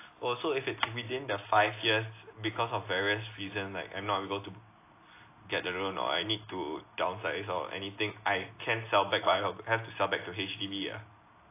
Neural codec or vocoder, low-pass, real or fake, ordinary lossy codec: none; 3.6 kHz; real; AAC, 24 kbps